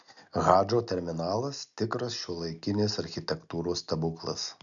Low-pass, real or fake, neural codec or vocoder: 7.2 kHz; real; none